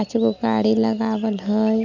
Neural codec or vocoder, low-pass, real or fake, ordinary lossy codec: none; 7.2 kHz; real; none